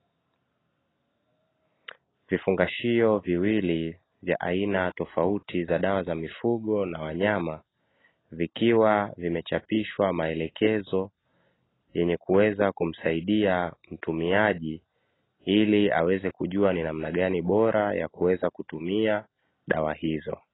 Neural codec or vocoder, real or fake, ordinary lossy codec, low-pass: none; real; AAC, 16 kbps; 7.2 kHz